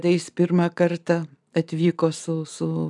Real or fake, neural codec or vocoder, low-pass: real; none; 10.8 kHz